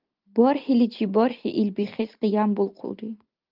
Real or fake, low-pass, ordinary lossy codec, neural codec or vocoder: real; 5.4 kHz; Opus, 24 kbps; none